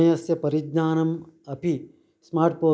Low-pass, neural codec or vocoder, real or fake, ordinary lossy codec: none; none; real; none